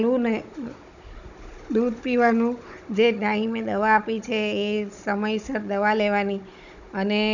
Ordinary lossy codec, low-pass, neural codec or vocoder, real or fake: none; 7.2 kHz; codec, 16 kHz, 16 kbps, FunCodec, trained on Chinese and English, 50 frames a second; fake